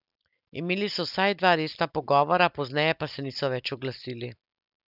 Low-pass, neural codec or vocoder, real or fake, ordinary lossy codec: 5.4 kHz; none; real; none